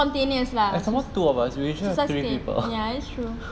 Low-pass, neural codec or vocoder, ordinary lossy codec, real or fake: none; none; none; real